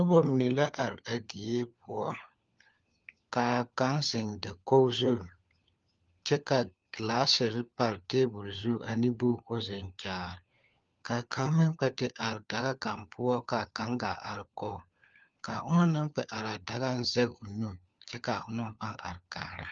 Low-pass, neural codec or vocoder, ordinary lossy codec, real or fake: 7.2 kHz; codec, 16 kHz, 4 kbps, FunCodec, trained on LibriTTS, 50 frames a second; Opus, 32 kbps; fake